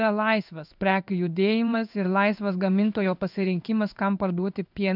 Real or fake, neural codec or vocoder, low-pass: fake; codec, 16 kHz in and 24 kHz out, 1 kbps, XY-Tokenizer; 5.4 kHz